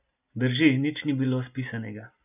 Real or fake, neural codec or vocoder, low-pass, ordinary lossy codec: real; none; 3.6 kHz; none